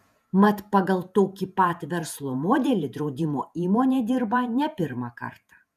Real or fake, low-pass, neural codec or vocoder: fake; 14.4 kHz; vocoder, 48 kHz, 128 mel bands, Vocos